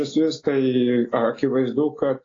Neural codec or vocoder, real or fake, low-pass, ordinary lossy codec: none; real; 7.2 kHz; AAC, 32 kbps